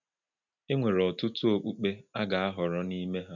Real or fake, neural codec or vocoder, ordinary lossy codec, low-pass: real; none; none; 7.2 kHz